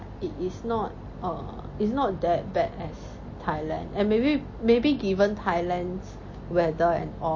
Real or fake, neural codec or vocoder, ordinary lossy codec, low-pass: real; none; MP3, 32 kbps; 7.2 kHz